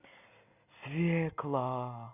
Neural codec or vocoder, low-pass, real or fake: none; 3.6 kHz; real